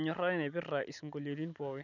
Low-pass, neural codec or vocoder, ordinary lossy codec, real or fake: 7.2 kHz; none; none; real